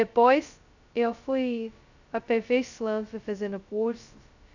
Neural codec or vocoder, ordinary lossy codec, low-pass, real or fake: codec, 16 kHz, 0.2 kbps, FocalCodec; none; 7.2 kHz; fake